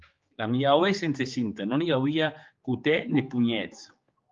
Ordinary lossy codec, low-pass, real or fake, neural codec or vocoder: Opus, 32 kbps; 7.2 kHz; fake; codec, 16 kHz, 4 kbps, X-Codec, HuBERT features, trained on general audio